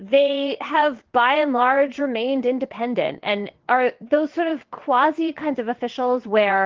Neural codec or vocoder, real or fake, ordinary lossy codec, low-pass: vocoder, 22.05 kHz, 80 mel bands, WaveNeXt; fake; Opus, 16 kbps; 7.2 kHz